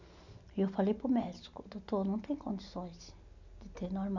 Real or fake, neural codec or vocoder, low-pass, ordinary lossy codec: real; none; 7.2 kHz; none